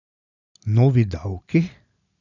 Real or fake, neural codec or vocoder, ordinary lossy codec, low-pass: real; none; none; 7.2 kHz